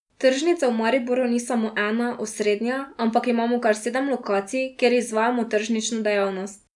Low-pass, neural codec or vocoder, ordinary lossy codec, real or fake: 10.8 kHz; none; none; real